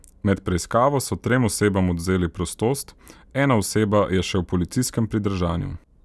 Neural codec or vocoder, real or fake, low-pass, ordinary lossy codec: none; real; none; none